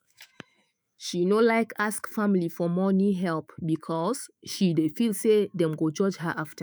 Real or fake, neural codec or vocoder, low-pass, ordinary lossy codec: fake; autoencoder, 48 kHz, 128 numbers a frame, DAC-VAE, trained on Japanese speech; none; none